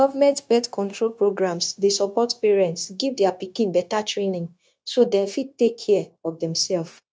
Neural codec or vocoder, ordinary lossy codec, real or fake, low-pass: codec, 16 kHz, 0.9 kbps, LongCat-Audio-Codec; none; fake; none